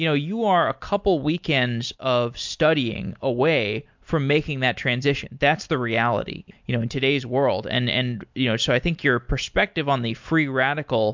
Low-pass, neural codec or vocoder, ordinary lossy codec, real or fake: 7.2 kHz; none; MP3, 64 kbps; real